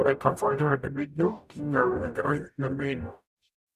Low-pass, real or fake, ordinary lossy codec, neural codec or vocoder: 14.4 kHz; fake; none; codec, 44.1 kHz, 0.9 kbps, DAC